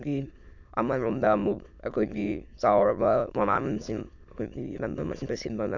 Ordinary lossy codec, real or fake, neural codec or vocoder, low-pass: none; fake; autoencoder, 22.05 kHz, a latent of 192 numbers a frame, VITS, trained on many speakers; 7.2 kHz